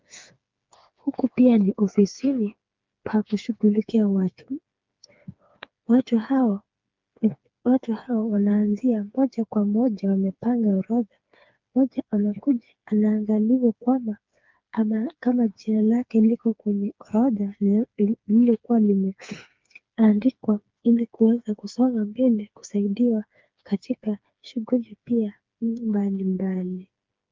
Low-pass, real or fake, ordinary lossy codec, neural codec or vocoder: 7.2 kHz; fake; Opus, 32 kbps; codec, 16 kHz, 4 kbps, FreqCodec, smaller model